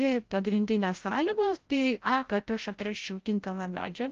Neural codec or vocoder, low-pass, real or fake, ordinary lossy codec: codec, 16 kHz, 0.5 kbps, FreqCodec, larger model; 7.2 kHz; fake; Opus, 32 kbps